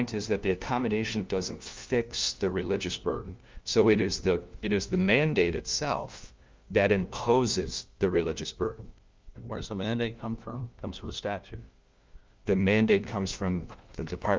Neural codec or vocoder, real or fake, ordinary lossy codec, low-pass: codec, 16 kHz, 1 kbps, FunCodec, trained on LibriTTS, 50 frames a second; fake; Opus, 24 kbps; 7.2 kHz